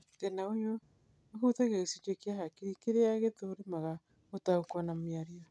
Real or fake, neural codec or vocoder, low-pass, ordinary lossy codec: real; none; none; none